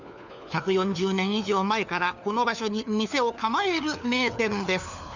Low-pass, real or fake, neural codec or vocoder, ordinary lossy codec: 7.2 kHz; fake; codec, 16 kHz, 4 kbps, FunCodec, trained on LibriTTS, 50 frames a second; none